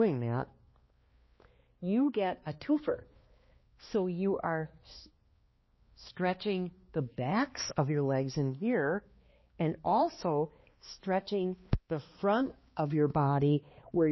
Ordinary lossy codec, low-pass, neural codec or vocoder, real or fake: MP3, 24 kbps; 7.2 kHz; codec, 16 kHz, 2 kbps, X-Codec, HuBERT features, trained on balanced general audio; fake